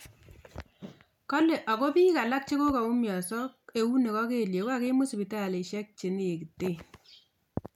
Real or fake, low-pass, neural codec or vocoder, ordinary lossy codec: real; 19.8 kHz; none; none